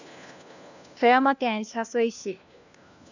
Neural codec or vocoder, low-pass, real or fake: codec, 16 kHz, 1 kbps, FunCodec, trained on LibriTTS, 50 frames a second; 7.2 kHz; fake